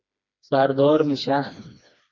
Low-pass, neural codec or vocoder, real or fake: 7.2 kHz; codec, 16 kHz, 2 kbps, FreqCodec, smaller model; fake